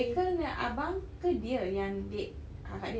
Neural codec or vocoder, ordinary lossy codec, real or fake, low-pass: none; none; real; none